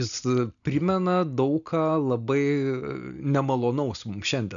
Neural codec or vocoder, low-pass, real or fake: none; 7.2 kHz; real